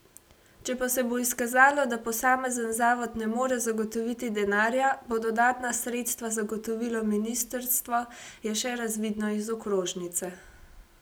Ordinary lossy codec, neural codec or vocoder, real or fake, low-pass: none; none; real; none